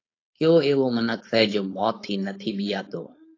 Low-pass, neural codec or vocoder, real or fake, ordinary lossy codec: 7.2 kHz; codec, 16 kHz, 4.8 kbps, FACodec; fake; AAC, 32 kbps